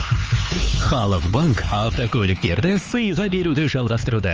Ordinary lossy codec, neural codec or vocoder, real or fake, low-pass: Opus, 24 kbps; codec, 16 kHz, 4 kbps, X-Codec, HuBERT features, trained on LibriSpeech; fake; 7.2 kHz